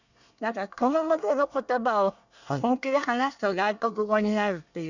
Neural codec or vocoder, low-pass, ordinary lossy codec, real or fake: codec, 24 kHz, 1 kbps, SNAC; 7.2 kHz; none; fake